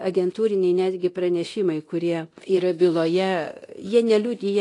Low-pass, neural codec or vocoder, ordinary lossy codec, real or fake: 10.8 kHz; codec, 24 kHz, 0.9 kbps, DualCodec; AAC, 48 kbps; fake